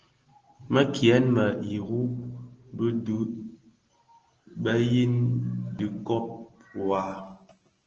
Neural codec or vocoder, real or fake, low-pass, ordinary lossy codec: none; real; 7.2 kHz; Opus, 16 kbps